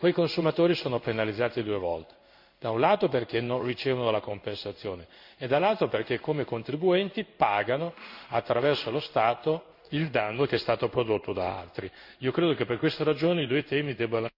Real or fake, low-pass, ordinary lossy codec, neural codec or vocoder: fake; 5.4 kHz; none; codec, 16 kHz in and 24 kHz out, 1 kbps, XY-Tokenizer